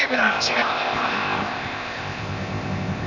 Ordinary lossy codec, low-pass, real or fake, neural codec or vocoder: none; 7.2 kHz; fake; codec, 16 kHz, 0.8 kbps, ZipCodec